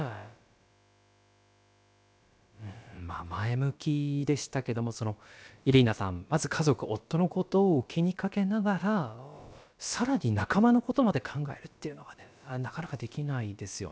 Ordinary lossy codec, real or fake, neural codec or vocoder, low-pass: none; fake; codec, 16 kHz, about 1 kbps, DyCAST, with the encoder's durations; none